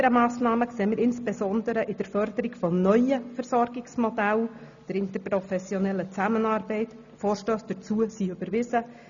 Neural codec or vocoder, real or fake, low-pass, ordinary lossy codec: none; real; 7.2 kHz; MP3, 96 kbps